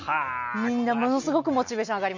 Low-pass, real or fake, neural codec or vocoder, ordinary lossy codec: 7.2 kHz; real; none; none